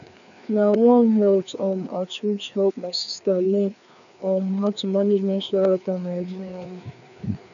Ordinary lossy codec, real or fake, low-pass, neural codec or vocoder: none; fake; 7.2 kHz; codec, 16 kHz, 2 kbps, FreqCodec, larger model